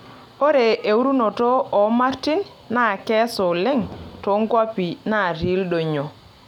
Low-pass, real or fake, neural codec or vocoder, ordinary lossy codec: 19.8 kHz; real; none; none